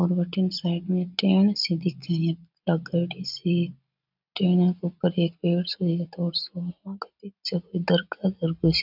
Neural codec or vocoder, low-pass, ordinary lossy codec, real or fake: none; 5.4 kHz; none; real